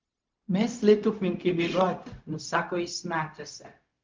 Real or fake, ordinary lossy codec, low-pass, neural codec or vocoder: fake; Opus, 24 kbps; 7.2 kHz; codec, 16 kHz, 0.4 kbps, LongCat-Audio-Codec